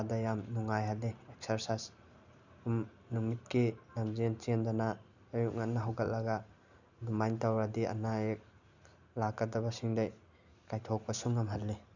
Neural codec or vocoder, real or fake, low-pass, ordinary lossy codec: none; real; 7.2 kHz; none